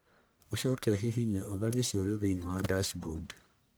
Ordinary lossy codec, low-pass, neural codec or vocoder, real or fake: none; none; codec, 44.1 kHz, 1.7 kbps, Pupu-Codec; fake